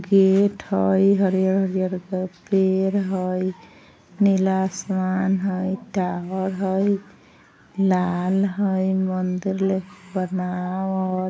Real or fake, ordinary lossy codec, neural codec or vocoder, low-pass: real; none; none; none